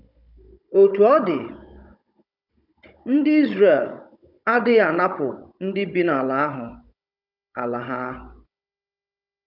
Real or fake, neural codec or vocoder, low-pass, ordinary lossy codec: fake; codec, 16 kHz, 16 kbps, FunCodec, trained on Chinese and English, 50 frames a second; 5.4 kHz; none